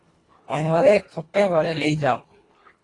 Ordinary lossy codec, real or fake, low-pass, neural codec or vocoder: AAC, 32 kbps; fake; 10.8 kHz; codec, 24 kHz, 1.5 kbps, HILCodec